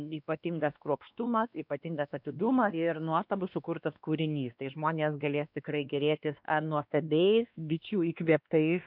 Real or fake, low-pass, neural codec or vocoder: fake; 5.4 kHz; codec, 16 kHz, 2 kbps, X-Codec, WavLM features, trained on Multilingual LibriSpeech